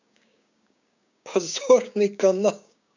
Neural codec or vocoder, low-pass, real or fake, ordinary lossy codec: codec, 16 kHz, 6 kbps, DAC; 7.2 kHz; fake; none